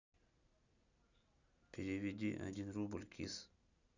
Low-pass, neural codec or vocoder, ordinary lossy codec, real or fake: 7.2 kHz; none; none; real